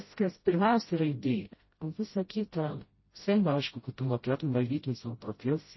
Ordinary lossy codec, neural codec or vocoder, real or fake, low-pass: MP3, 24 kbps; codec, 16 kHz, 0.5 kbps, FreqCodec, smaller model; fake; 7.2 kHz